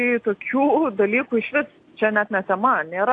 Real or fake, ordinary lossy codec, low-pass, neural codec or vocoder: real; AAC, 48 kbps; 9.9 kHz; none